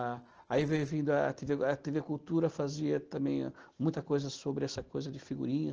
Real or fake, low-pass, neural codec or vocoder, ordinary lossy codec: real; 7.2 kHz; none; Opus, 16 kbps